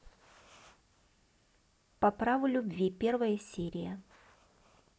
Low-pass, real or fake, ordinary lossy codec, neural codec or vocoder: none; real; none; none